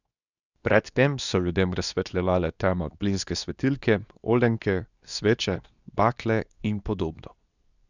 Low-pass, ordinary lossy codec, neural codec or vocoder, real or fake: 7.2 kHz; none; codec, 24 kHz, 0.9 kbps, WavTokenizer, medium speech release version 2; fake